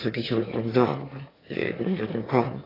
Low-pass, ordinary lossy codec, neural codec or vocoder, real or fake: 5.4 kHz; AAC, 24 kbps; autoencoder, 22.05 kHz, a latent of 192 numbers a frame, VITS, trained on one speaker; fake